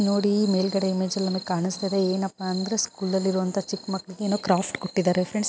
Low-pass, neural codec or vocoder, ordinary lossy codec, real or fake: none; none; none; real